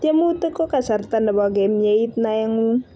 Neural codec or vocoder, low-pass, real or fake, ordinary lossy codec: none; none; real; none